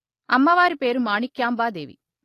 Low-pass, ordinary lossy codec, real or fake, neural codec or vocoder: 14.4 kHz; AAC, 48 kbps; real; none